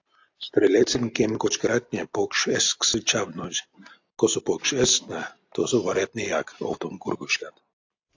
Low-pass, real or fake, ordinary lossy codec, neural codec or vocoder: 7.2 kHz; real; AAC, 48 kbps; none